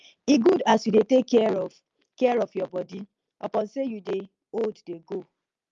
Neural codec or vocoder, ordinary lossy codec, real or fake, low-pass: none; Opus, 32 kbps; real; 7.2 kHz